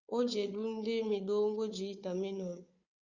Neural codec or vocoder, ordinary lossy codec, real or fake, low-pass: codec, 16 kHz, 4 kbps, FunCodec, trained on Chinese and English, 50 frames a second; Opus, 64 kbps; fake; 7.2 kHz